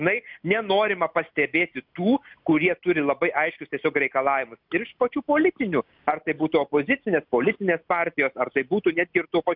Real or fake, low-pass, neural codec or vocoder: real; 5.4 kHz; none